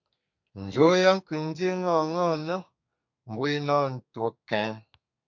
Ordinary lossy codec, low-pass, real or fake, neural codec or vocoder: MP3, 48 kbps; 7.2 kHz; fake; codec, 32 kHz, 1.9 kbps, SNAC